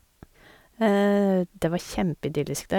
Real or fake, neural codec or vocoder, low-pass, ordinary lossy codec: real; none; 19.8 kHz; none